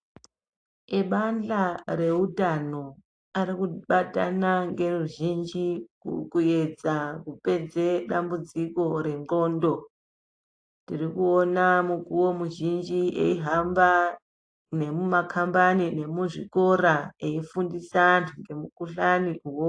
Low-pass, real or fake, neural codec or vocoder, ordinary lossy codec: 9.9 kHz; real; none; AAC, 48 kbps